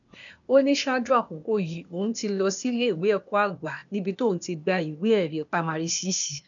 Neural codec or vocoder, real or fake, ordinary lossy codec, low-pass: codec, 16 kHz, 0.8 kbps, ZipCodec; fake; none; 7.2 kHz